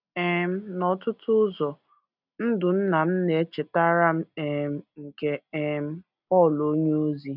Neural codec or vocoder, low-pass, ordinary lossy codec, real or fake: none; 5.4 kHz; none; real